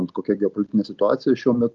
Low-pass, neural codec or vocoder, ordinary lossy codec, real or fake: 7.2 kHz; none; Opus, 32 kbps; real